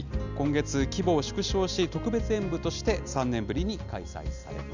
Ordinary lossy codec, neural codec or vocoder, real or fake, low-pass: none; none; real; 7.2 kHz